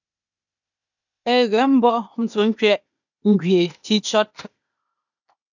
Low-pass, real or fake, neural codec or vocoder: 7.2 kHz; fake; codec, 16 kHz, 0.8 kbps, ZipCodec